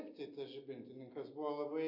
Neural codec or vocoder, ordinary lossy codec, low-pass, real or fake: none; AAC, 48 kbps; 5.4 kHz; real